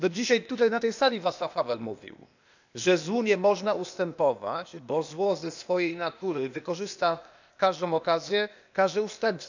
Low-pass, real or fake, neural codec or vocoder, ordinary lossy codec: 7.2 kHz; fake; codec, 16 kHz, 0.8 kbps, ZipCodec; none